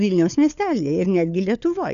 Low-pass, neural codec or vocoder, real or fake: 7.2 kHz; codec, 16 kHz, 4 kbps, FunCodec, trained on LibriTTS, 50 frames a second; fake